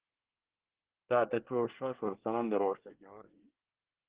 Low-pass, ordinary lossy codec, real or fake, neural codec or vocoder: 3.6 kHz; Opus, 16 kbps; fake; codec, 24 kHz, 1 kbps, SNAC